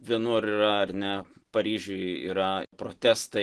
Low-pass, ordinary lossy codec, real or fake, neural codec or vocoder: 10.8 kHz; Opus, 16 kbps; real; none